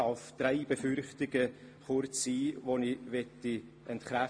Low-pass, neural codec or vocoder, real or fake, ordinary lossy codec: 9.9 kHz; none; real; MP3, 48 kbps